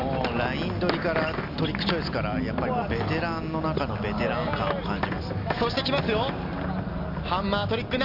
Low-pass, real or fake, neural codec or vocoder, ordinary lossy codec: 5.4 kHz; real; none; none